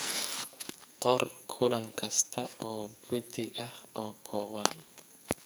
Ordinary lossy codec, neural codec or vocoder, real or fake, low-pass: none; codec, 44.1 kHz, 2.6 kbps, SNAC; fake; none